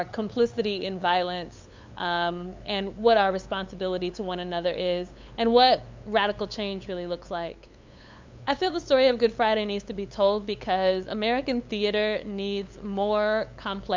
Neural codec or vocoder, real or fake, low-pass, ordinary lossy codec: codec, 16 kHz, 8 kbps, FunCodec, trained on LibriTTS, 25 frames a second; fake; 7.2 kHz; MP3, 64 kbps